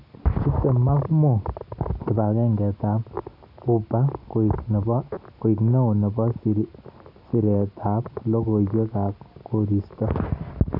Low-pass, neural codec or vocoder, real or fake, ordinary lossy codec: 5.4 kHz; none; real; none